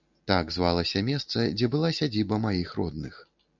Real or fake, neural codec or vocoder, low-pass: real; none; 7.2 kHz